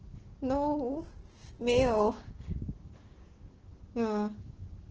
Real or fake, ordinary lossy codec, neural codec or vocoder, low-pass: fake; Opus, 16 kbps; codec, 16 kHz, 6 kbps, DAC; 7.2 kHz